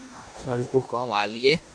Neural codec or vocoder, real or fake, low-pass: codec, 16 kHz in and 24 kHz out, 0.9 kbps, LongCat-Audio-Codec, four codebook decoder; fake; 9.9 kHz